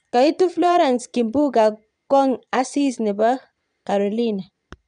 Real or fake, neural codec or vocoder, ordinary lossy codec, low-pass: fake; vocoder, 22.05 kHz, 80 mel bands, Vocos; none; 9.9 kHz